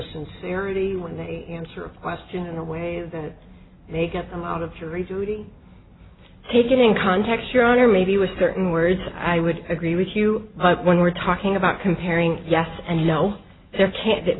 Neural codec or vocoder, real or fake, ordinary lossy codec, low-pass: none; real; AAC, 16 kbps; 7.2 kHz